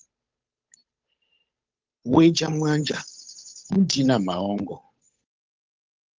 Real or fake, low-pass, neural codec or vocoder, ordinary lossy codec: fake; 7.2 kHz; codec, 16 kHz, 8 kbps, FunCodec, trained on Chinese and English, 25 frames a second; Opus, 32 kbps